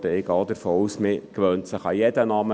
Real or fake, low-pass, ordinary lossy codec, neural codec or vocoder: real; none; none; none